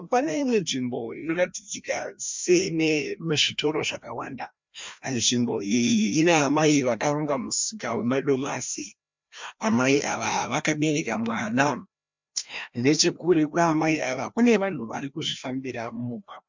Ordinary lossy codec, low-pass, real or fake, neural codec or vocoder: MP3, 64 kbps; 7.2 kHz; fake; codec, 16 kHz, 1 kbps, FreqCodec, larger model